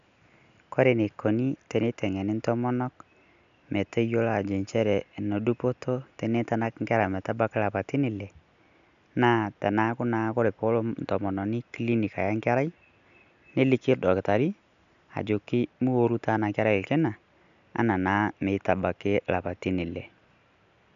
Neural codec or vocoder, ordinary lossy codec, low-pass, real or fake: none; none; 7.2 kHz; real